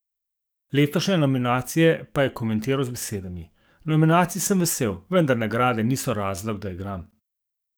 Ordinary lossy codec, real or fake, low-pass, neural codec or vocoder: none; fake; none; codec, 44.1 kHz, 7.8 kbps, DAC